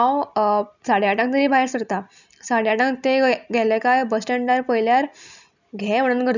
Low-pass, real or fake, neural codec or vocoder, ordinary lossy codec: 7.2 kHz; real; none; none